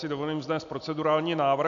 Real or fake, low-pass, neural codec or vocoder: real; 7.2 kHz; none